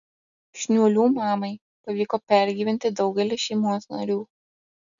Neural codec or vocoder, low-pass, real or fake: none; 7.2 kHz; real